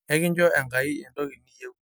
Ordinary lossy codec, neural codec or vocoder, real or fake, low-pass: none; none; real; none